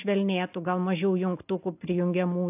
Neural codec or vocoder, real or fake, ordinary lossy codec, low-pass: none; real; AAC, 32 kbps; 3.6 kHz